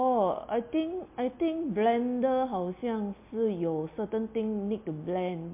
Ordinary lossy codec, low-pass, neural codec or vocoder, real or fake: MP3, 24 kbps; 3.6 kHz; none; real